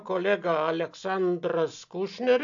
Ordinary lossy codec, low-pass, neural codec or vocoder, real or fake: AAC, 64 kbps; 7.2 kHz; none; real